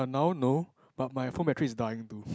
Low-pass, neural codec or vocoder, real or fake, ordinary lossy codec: none; none; real; none